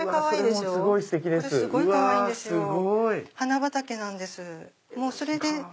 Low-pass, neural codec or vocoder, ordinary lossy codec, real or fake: none; none; none; real